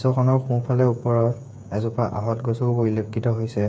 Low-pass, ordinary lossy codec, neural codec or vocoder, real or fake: none; none; codec, 16 kHz, 8 kbps, FreqCodec, smaller model; fake